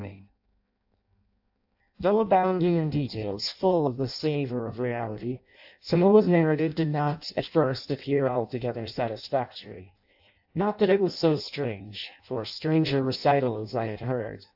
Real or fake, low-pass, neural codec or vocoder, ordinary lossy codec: fake; 5.4 kHz; codec, 16 kHz in and 24 kHz out, 0.6 kbps, FireRedTTS-2 codec; AAC, 48 kbps